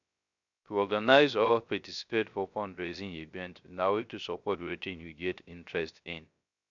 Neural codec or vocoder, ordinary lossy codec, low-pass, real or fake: codec, 16 kHz, 0.3 kbps, FocalCodec; none; 7.2 kHz; fake